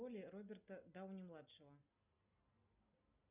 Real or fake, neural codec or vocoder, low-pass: real; none; 3.6 kHz